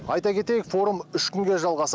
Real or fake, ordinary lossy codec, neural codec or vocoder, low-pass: real; none; none; none